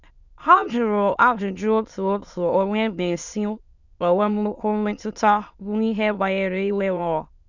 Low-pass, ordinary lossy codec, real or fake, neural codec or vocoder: 7.2 kHz; none; fake; autoencoder, 22.05 kHz, a latent of 192 numbers a frame, VITS, trained on many speakers